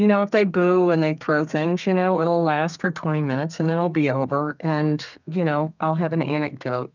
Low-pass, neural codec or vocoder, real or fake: 7.2 kHz; codec, 32 kHz, 1.9 kbps, SNAC; fake